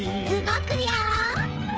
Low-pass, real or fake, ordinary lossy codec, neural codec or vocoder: none; fake; none; codec, 16 kHz, 8 kbps, FreqCodec, smaller model